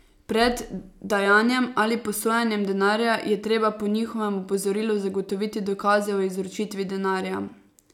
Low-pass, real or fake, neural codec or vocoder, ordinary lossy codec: 19.8 kHz; real; none; none